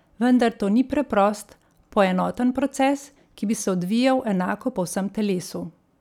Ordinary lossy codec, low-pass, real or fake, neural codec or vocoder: none; 19.8 kHz; real; none